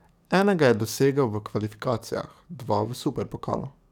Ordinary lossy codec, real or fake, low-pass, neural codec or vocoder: none; fake; 19.8 kHz; codec, 44.1 kHz, 7.8 kbps, DAC